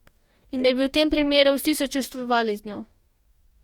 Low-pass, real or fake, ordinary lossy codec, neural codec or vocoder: 19.8 kHz; fake; Opus, 64 kbps; codec, 44.1 kHz, 2.6 kbps, DAC